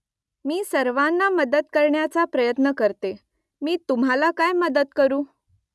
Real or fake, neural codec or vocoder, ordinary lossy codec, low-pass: real; none; none; none